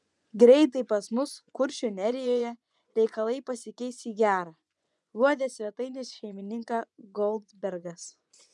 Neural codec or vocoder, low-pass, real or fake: vocoder, 24 kHz, 100 mel bands, Vocos; 10.8 kHz; fake